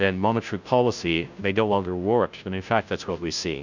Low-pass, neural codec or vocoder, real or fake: 7.2 kHz; codec, 16 kHz, 0.5 kbps, FunCodec, trained on Chinese and English, 25 frames a second; fake